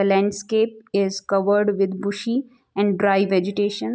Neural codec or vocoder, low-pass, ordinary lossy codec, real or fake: none; none; none; real